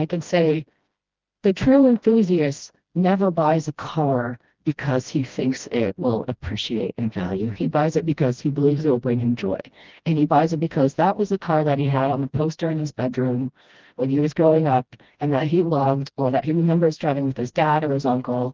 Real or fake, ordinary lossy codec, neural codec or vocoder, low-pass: fake; Opus, 32 kbps; codec, 16 kHz, 1 kbps, FreqCodec, smaller model; 7.2 kHz